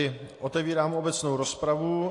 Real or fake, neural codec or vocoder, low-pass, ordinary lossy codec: real; none; 10.8 kHz; AAC, 48 kbps